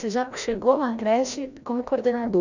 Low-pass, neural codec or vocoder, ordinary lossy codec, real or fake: 7.2 kHz; codec, 16 kHz, 1 kbps, FreqCodec, larger model; none; fake